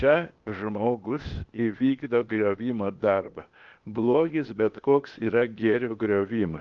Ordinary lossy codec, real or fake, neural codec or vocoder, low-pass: Opus, 24 kbps; fake; codec, 16 kHz, 0.8 kbps, ZipCodec; 7.2 kHz